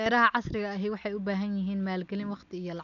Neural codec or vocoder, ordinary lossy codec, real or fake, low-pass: none; none; real; 7.2 kHz